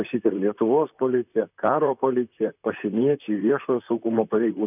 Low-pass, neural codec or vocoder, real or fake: 3.6 kHz; vocoder, 44.1 kHz, 128 mel bands, Pupu-Vocoder; fake